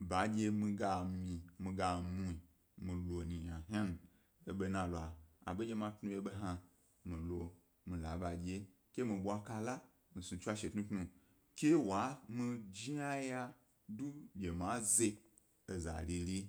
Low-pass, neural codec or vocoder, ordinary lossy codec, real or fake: none; none; none; real